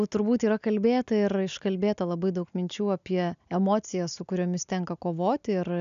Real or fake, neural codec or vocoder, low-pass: real; none; 7.2 kHz